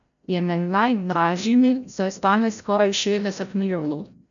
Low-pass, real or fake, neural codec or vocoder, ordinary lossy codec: 7.2 kHz; fake; codec, 16 kHz, 0.5 kbps, FreqCodec, larger model; none